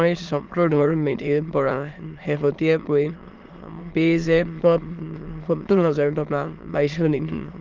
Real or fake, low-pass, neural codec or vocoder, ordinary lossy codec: fake; 7.2 kHz; autoencoder, 22.05 kHz, a latent of 192 numbers a frame, VITS, trained on many speakers; Opus, 32 kbps